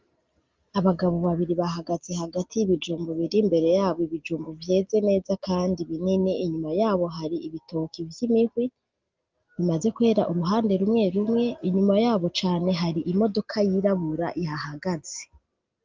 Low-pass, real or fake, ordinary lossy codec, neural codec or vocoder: 7.2 kHz; real; Opus, 32 kbps; none